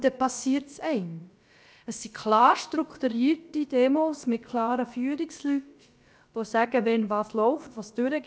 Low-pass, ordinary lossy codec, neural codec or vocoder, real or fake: none; none; codec, 16 kHz, about 1 kbps, DyCAST, with the encoder's durations; fake